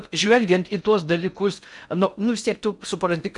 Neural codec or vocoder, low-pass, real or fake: codec, 16 kHz in and 24 kHz out, 0.6 kbps, FocalCodec, streaming, 4096 codes; 10.8 kHz; fake